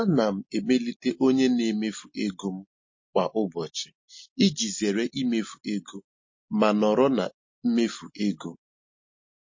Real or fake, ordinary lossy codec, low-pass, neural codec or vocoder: real; MP3, 32 kbps; 7.2 kHz; none